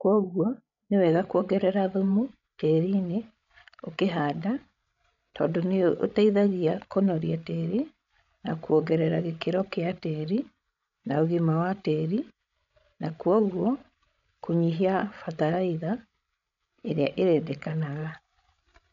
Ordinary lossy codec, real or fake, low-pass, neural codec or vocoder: none; fake; 7.2 kHz; codec, 16 kHz, 16 kbps, FreqCodec, larger model